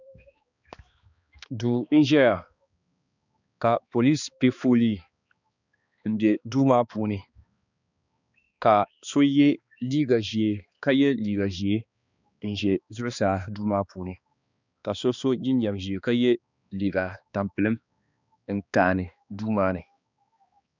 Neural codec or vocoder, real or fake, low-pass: codec, 16 kHz, 2 kbps, X-Codec, HuBERT features, trained on balanced general audio; fake; 7.2 kHz